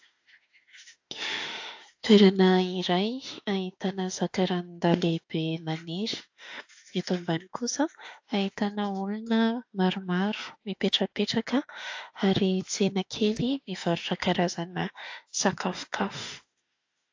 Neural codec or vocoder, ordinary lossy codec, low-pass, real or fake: autoencoder, 48 kHz, 32 numbers a frame, DAC-VAE, trained on Japanese speech; AAC, 48 kbps; 7.2 kHz; fake